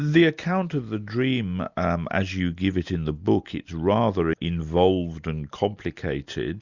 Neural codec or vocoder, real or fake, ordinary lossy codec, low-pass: none; real; Opus, 64 kbps; 7.2 kHz